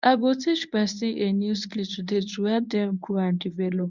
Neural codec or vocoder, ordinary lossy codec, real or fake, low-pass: codec, 24 kHz, 0.9 kbps, WavTokenizer, medium speech release version 1; none; fake; 7.2 kHz